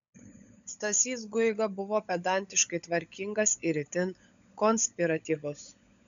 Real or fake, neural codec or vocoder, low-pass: fake; codec, 16 kHz, 16 kbps, FunCodec, trained on LibriTTS, 50 frames a second; 7.2 kHz